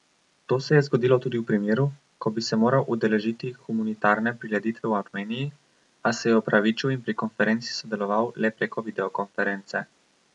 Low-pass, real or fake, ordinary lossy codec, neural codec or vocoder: 10.8 kHz; real; none; none